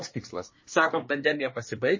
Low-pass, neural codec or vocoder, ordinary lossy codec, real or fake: 7.2 kHz; codec, 24 kHz, 1 kbps, SNAC; MP3, 32 kbps; fake